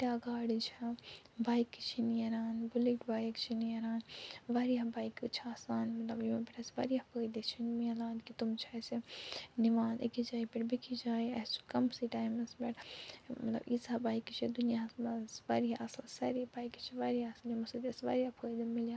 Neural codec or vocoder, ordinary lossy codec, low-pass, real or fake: none; none; none; real